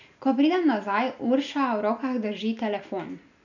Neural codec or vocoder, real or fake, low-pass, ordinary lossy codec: none; real; 7.2 kHz; none